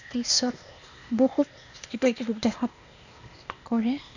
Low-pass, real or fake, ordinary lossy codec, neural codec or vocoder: 7.2 kHz; fake; none; codec, 16 kHz, 0.8 kbps, ZipCodec